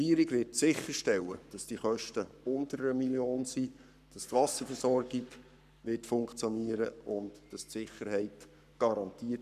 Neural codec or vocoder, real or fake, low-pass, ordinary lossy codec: codec, 44.1 kHz, 7.8 kbps, Pupu-Codec; fake; 14.4 kHz; none